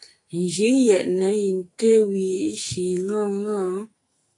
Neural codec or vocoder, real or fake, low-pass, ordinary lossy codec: codec, 44.1 kHz, 2.6 kbps, SNAC; fake; 10.8 kHz; AAC, 48 kbps